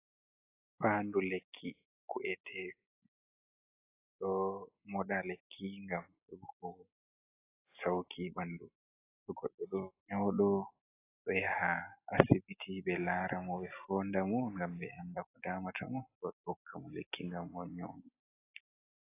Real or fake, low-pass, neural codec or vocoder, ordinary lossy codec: real; 3.6 kHz; none; AAC, 24 kbps